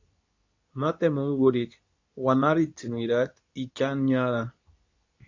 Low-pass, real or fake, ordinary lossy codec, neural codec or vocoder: 7.2 kHz; fake; AAC, 48 kbps; codec, 24 kHz, 0.9 kbps, WavTokenizer, medium speech release version 2